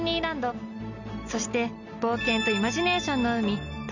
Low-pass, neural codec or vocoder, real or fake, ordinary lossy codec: 7.2 kHz; none; real; none